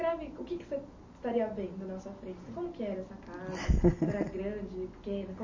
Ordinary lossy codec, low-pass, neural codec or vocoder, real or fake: MP3, 32 kbps; 7.2 kHz; none; real